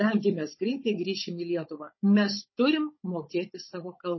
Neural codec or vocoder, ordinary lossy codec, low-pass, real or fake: codec, 16 kHz, 16 kbps, FunCodec, trained on Chinese and English, 50 frames a second; MP3, 24 kbps; 7.2 kHz; fake